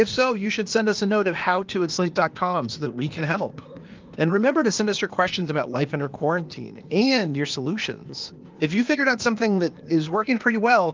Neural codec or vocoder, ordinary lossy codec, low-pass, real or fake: codec, 16 kHz, 0.8 kbps, ZipCodec; Opus, 24 kbps; 7.2 kHz; fake